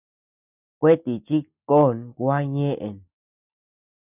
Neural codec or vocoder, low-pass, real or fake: none; 3.6 kHz; real